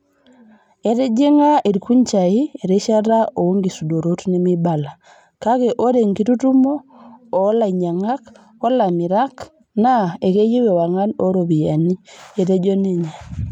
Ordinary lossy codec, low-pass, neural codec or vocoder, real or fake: none; 19.8 kHz; none; real